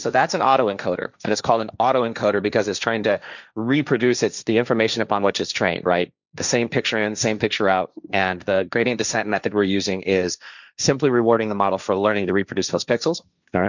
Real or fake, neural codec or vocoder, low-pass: fake; codec, 16 kHz, 1.1 kbps, Voila-Tokenizer; 7.2 kHz